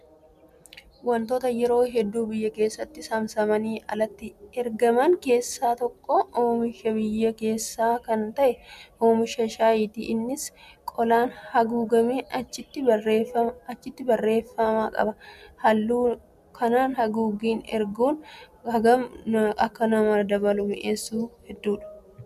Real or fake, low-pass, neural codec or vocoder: real; 14.4 kHz; none